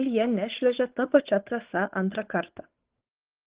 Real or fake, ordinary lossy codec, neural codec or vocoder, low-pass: fake; Opus, 16 kbps; codec, 16 kHz, 8 kbps, FunCodec, trained on Chinese and English, 25 frames a second; 3.6 kHz